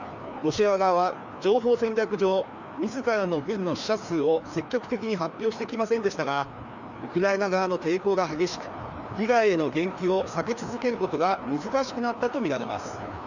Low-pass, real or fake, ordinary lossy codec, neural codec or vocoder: 7.2 kHz; fake; none; codec, 16 kHz, 2 kbps, FreqCodec, larger model